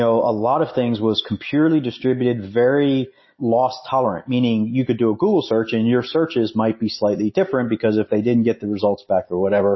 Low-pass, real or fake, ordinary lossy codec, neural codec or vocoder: 7.2 kHz; real; MP3, 24 kbps; none